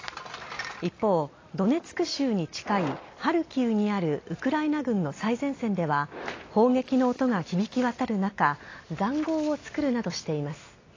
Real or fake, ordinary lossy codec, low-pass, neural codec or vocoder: real; AAC, 32 kbps; 7.2 kHz; none